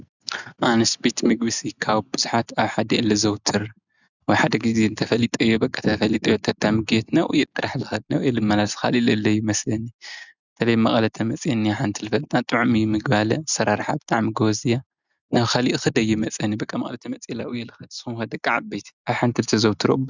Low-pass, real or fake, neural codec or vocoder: 7.2 kHz; real; none